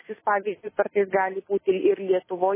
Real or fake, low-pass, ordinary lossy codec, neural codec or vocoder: real; 3.6 kHz; MP3, 16 kbps; none